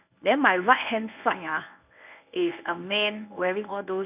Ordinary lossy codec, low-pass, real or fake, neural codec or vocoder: none; 3.6 kHz; fake; codec, 24 kHz, 0.9 kbps, WavTokenizer, medium speech release version 1